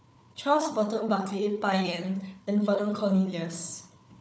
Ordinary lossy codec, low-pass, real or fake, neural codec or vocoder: none; none; fake; codec, 16 kHz, 4 kbps, FunCodec, trained on Chinese and English, 50 frames a second